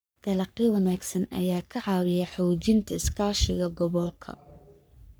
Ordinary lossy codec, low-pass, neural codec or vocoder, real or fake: none; none; codec, 44.1 kHz, 3.4 kbps, Pupu-Codec; fake